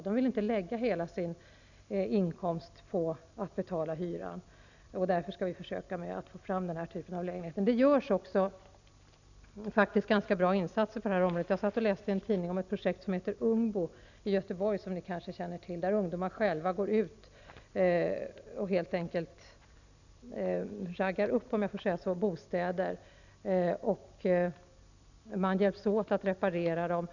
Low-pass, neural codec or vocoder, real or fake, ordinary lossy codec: 7.2 kHz; none; real; none